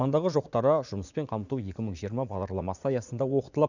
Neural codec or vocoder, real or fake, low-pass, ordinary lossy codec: vocoder, 44.1 kHz, 80 mel bands, Vocos; fake; 7.2 kHz; none